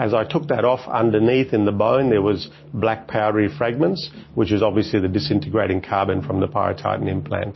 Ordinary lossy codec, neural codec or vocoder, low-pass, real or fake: MP3, 24 kbps; none; 7.2 kHz; real